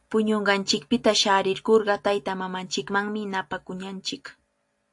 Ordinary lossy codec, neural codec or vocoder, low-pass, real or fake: AAC, 64 kbps; none; 10.8 kHz; real